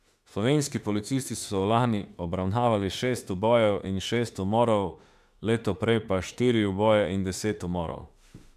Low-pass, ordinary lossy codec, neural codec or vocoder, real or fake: 14.4 kHz; AAC, 96 kbps; autoencoder, 48 kHz, 32 numbers a frame, DAC-VAE, trained on Japanese speech; fake